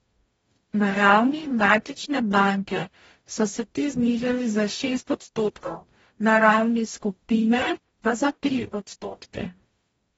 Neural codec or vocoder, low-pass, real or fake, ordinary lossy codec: codec, 44.1 kHz, 0.9 kbps, DAC; 19.8 kHz; fake; AAC, 24 kbps